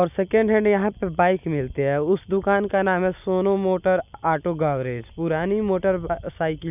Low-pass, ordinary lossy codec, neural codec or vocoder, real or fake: 3.6 kHz; none; none; real